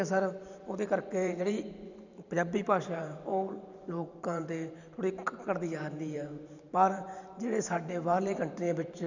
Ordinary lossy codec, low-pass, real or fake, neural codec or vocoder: none; 7.2 kHz; fake; vocoder, 44.1 kHz, 128 mel bands every 512 samples, BigVGAN v2